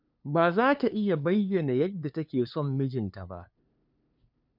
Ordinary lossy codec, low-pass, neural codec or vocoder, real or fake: none; 5.4 kHz; codec, 16 kHz, 2 kbps, FunCodec, trained on LibriTTS, 25 frames a second; fake